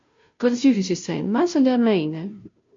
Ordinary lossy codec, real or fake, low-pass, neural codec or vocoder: MP3, 48 kbps; fake; 7.2 kHz; codec, 16 kHz, 0.5 kbps, FunCodec, trained on Chinese and English, 25 frames a second